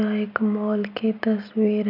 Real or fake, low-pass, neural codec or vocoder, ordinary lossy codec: real; 5.4 kHz; none; none